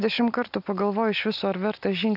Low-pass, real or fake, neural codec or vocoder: 5.4 kHz; real; none